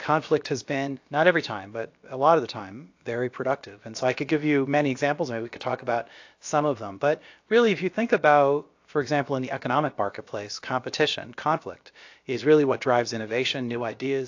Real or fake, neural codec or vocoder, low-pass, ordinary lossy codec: fake; codec, 16 kHz, about 1 kbps, DyCAST, with the encoder's durations; 7.2 kHz; AAC, 48 kbps